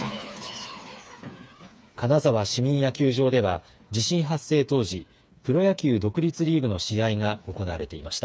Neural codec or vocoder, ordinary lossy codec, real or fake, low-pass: codec, 16 kHz, 4 kbps, FreqCodec, smaller model; none; fake; none